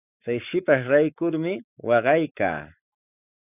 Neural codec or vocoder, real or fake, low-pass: codec, 44.1 kHz, 7.8 kbps, Pupu-Codec; fake; 3.6 kHz